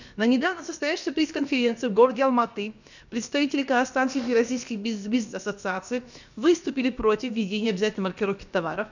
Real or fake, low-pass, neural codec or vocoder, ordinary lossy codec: fake; 7.2 kHz; codec, 16 kHz, about 1 kbps, DyCAST, with the encoder's durations; none